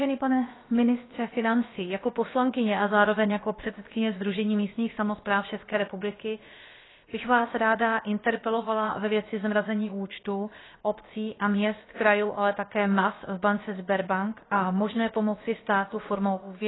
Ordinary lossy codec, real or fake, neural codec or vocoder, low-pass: AAC, 16 kbps; fake; codec, 16 kHz, about 1 kbps, DyCAST, with the encoder's durations; 7.2 kHz